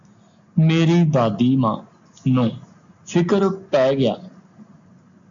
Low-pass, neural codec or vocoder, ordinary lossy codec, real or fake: 7.2 kHz; none; AAC, 64 kbps; real